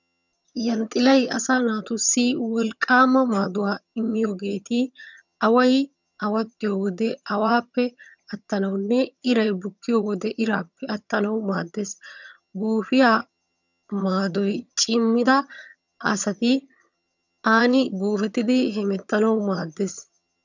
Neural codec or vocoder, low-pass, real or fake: vocoder, 22.05 kHz, 80 mel bands, HiFi-GAN; 7.2 kHz; fake